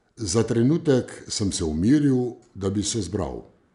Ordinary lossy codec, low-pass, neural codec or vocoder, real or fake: none; 10.8 kHz; none; real